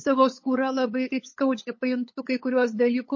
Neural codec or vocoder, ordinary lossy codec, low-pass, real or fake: codec, 16 kHz, 8 kbps, FunCodec, trained on LibriTTS, 25 frames a second; MP3, 32 kbps; 7.2 kHz; fake